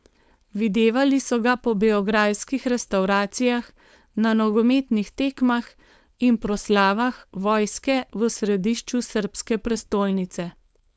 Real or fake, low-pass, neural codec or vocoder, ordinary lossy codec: fake; none; codec, 16 kHz, 4.8 kbps, FACodec; none